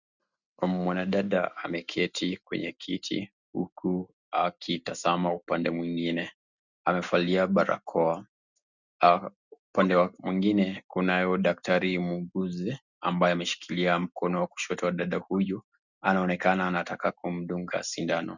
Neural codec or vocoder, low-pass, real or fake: none; 7.2 kHz; real